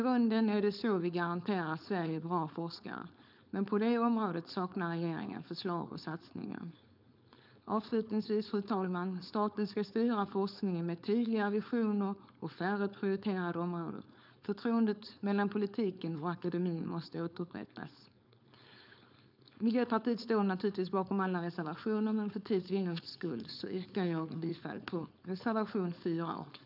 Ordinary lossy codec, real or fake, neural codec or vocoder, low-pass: none; fake; codec, 16 kHz, 4.8 kbps, FACodec; 5.4 kHz